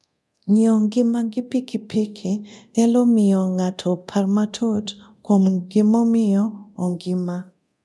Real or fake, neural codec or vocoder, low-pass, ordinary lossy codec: fake; codec, 24 kHz, 0.9 kbps, DualCodec; none; none